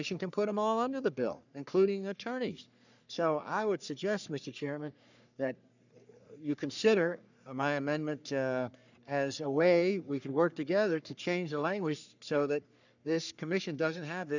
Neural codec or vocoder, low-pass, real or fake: codec, 44.1 kHz, 3.4 kbps, Pupu-Codec; 7.2 kHz; fake